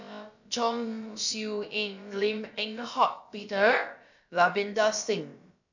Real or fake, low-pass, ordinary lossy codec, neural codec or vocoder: fake; 7.2 kHz; none; codec, 16 kHz, about 1 kbps, DyCAST, with the encoder's durations